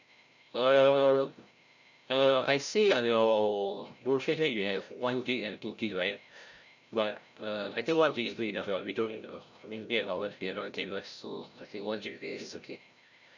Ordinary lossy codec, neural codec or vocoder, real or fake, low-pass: none; codec, 16 kHz, 0.5 kbps, FreqCodec, larger model; fake; 7.2 kHz